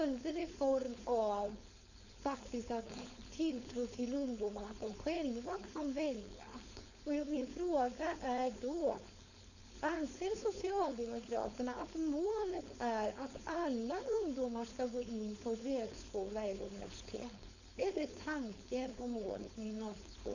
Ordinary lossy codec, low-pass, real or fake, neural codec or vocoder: none; 7.2 kHz; fake; codec, 16 kHz, 4.8 kbps, FACodec